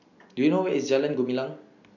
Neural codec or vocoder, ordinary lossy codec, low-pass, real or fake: none; none; 7.2 kHz; real